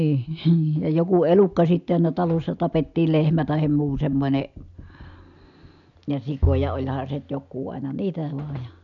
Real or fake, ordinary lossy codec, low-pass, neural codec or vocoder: real; none; 7.2 kHz; none